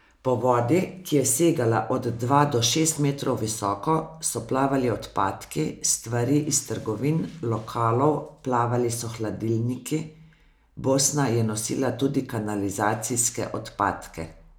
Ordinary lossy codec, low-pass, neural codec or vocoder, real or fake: none; none; none; real